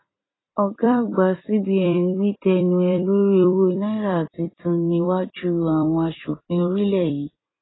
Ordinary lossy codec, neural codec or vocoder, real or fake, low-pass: AAC, 16 kbps; vocoder, 44.1 kHz, 128 mel bands every 256 samples, BigVGAN v2; fake; 7.2 kHz